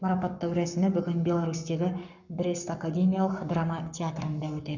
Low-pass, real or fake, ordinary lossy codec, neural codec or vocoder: 7.2 kHz; fake; none; codec, 44.1 kHz, 7.8 kbps, Pupu-Codec